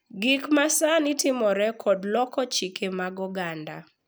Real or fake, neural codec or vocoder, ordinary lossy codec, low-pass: real; none; none; none